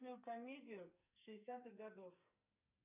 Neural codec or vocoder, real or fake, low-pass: codec, 44.1 kHz, 2.6 kbps, SNAC; fake; 3.6 kHz